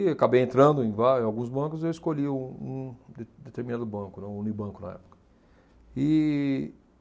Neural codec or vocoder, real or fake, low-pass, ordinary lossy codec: none; real; none; none